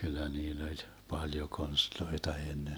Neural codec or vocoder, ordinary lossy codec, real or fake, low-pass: none; none; real; none